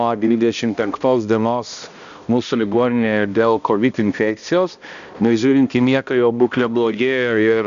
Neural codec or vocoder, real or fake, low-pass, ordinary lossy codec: codec, 16 kHz, 1 kbps, X-Codec, HuBERT features, trained on balanced general audio; fake; 7.2 kHz; MP3, 96 kbps